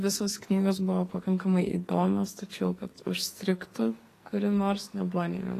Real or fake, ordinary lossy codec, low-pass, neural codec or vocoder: fake; AAC, 48 kbps; 14.4 kHz; codec, 44.1 kHz, 2.6 kbps, SNAC